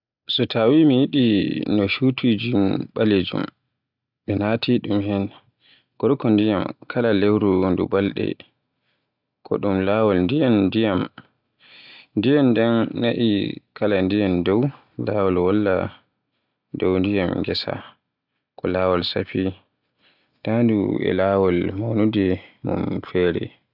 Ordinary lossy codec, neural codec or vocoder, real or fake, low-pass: AAC, 48 kbps; none; real; 5.4 kHz